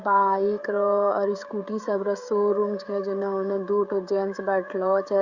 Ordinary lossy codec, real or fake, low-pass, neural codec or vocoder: Opus, 64 kbps; fake; 7.2 kHz; codec, 16 kHz, 16 kbps, FreqCodec, smaller model